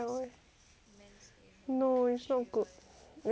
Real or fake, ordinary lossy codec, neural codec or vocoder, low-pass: real; none; none; none